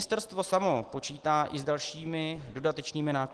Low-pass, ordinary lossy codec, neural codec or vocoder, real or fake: 10.8 kHz; Opus, 16 kbps; none; real